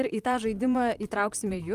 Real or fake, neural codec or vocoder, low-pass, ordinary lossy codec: fake; vocoder, 44.1 kHz, 128 mel bands, Pupu-Vocoder; 14.4 kHz; Opus, 32 kbps